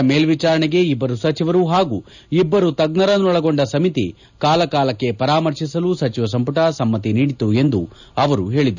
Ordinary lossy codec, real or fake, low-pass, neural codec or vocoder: none; real; 7.2 kHz; none